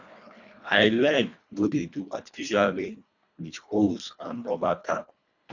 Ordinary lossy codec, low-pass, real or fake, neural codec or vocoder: none; 7.2 kHz; fake; codec, 24 kHz, 1.5 kbps, HILCodec